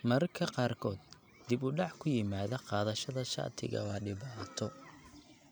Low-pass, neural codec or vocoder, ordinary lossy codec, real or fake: none; none; none; real